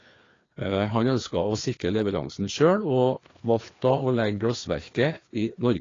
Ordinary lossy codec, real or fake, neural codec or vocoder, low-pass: AAC, 32 kbps; fake; codec, 16 kHz, 2 kbps, FreqCodec, larger model; 7.2 kHz